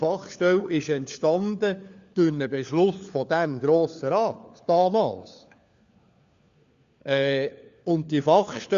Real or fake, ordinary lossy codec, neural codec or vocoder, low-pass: fake; Opus, 64 kbps; codec, 16 kHz, 4 kbps, FunCodec, trained on LibriTTS, 50 frames a second; 7.2 kHz